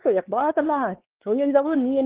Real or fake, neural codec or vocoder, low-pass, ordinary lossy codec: fake; codec, 16 kHz, 2 kbps, X-Codec, WavLM features, trained on Multilingual LibriSpeech; 3.6 kHz; Opus, 16 kbps